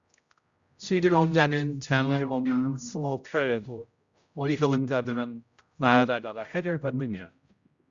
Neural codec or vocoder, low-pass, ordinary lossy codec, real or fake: codec, 16 kHz, 0.5 kbps, X-Codec, HuBERT features, trained on general audio; 7.2 kHz; Opus, 64 kbps; fake